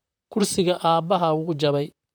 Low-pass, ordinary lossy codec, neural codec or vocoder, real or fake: none; none; vocoder, 44.1 kHz, 128 mel bands, Pupu-Vocoder; fake